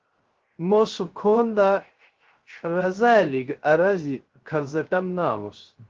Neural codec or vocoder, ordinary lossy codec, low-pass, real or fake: codec, 16 kHz, 0.3 kbps, FocalCodec; Opus, 16 kbps; 7.2 kHz; fake